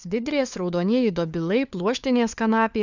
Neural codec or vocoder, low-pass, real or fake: codec, 16 kHz, 4 kbps, FunCodec, trained on LibriTTS, 50 frames a second; 7.2 kHz; fake